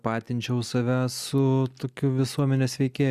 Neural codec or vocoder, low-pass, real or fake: none; 14.4 kHz; real